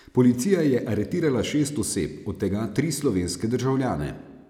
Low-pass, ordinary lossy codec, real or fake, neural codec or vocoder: 19.8 kHz; none; real; none